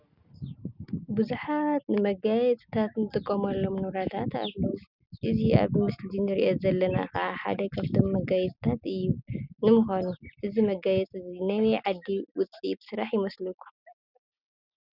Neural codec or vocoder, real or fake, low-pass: none; real; 5.4 kHz